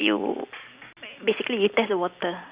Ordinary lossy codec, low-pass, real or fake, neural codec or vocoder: Opus, 64 kbps; 3.6 kHz; real; none